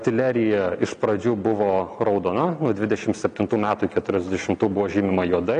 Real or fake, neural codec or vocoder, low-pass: real; none; 9.9 kHz